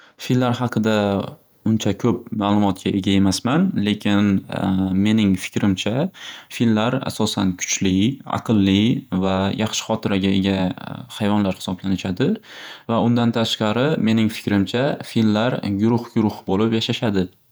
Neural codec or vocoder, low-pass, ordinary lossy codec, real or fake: vocoder, 48 kHz, 128 mel bands, Vocos; none; none; fake